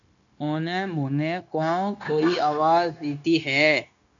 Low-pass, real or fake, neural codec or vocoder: 7.2 kHz; fake; codec, 16 kHz, 0.9 kbps, LongCat-Audio-Codec